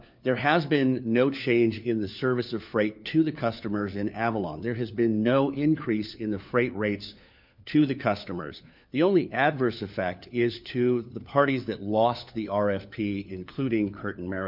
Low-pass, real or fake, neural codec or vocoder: 5.4 kHz; fake; codec, 16 kHz, 4 kbps, FunCodec, trained on LibriTTS, 50 frames a second